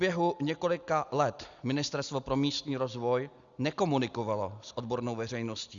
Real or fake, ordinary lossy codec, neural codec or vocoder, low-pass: real; Opus, 64 kbps; none; 7.2 kHz